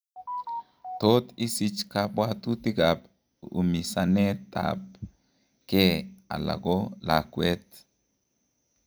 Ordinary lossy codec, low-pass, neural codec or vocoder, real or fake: none; none; none; real